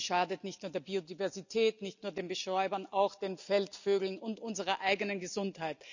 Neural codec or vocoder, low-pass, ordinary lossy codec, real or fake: none; 7.2 kHz; none; real